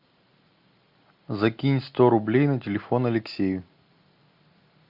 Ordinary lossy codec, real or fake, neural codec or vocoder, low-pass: MP3, 48 kbps; real; none; 5.4 kHz